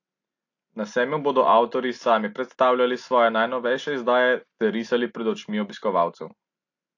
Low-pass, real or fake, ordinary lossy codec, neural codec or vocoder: 7.2 kHz; real; AAC, 48 kbps; none